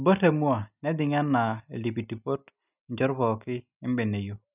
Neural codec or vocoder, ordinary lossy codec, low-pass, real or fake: none; none; 3.6 kHz; real